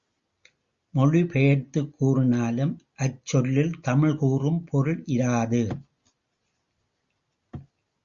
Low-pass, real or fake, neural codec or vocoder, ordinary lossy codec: 7.2 kHz; real; none; Opus, 64 kbps